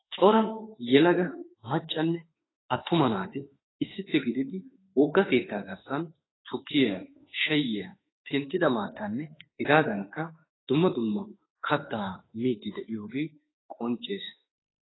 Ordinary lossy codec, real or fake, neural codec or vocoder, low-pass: AAC, 16 kbps; fake; autoencoder, 48 kHz, 32 numbers a frame, DAC-VAE, trained on Japanese speech; 7.2 kHz